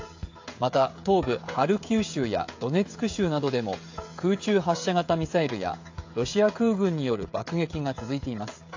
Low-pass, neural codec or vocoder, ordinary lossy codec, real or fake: 7.2 kHz; codec, 16 kHz, 16 kbps, FreqCodec, smaller model; AAC, 48 kbps; fake